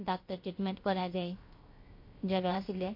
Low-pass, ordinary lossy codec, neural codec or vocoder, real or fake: 5.4 kHz; MP3, 32 kbps; codec, 16 kHz, 0.7 kbps, FocalCodec; fake